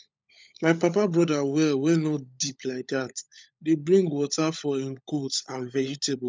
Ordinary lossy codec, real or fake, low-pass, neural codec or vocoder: none; fake; none; codec, 16 kHz, 16 kbps, FunCodec, trained on LibriTTS, 50 frames a second